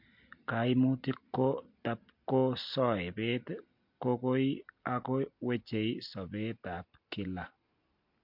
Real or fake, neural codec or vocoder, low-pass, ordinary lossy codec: real; none; 5.4 kHz; MP3, 48 kbps